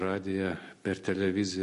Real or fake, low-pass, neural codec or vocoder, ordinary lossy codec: real; 14.4 kHz; none; MP3, 48 kbps